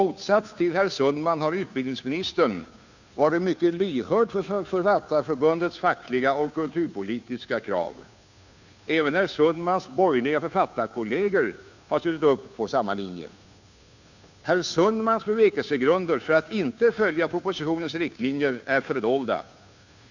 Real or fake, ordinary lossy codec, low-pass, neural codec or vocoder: fake; none; 7.2 kHz; codec, 16 kHz, 2 kbps, FunCodec, trained on Chinese and English, 25 frames a second